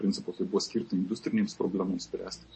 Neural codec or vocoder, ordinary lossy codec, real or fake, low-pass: none; MP3, 32 kbps; real; 9.9 kHz